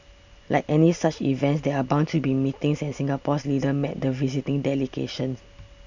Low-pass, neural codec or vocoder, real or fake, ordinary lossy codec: 7.2 kHz; none; real; none